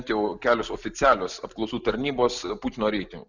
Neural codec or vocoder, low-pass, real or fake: none; 7.2 kHz; real